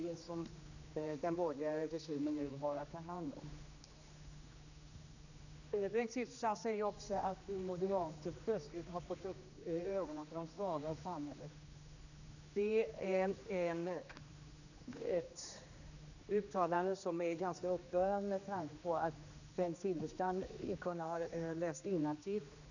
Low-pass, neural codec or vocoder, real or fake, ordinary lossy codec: 7.2 kHz; codec, 16 kHz, 2 kbps, X-Codec, HuBERT features, trained on general audio; fake; none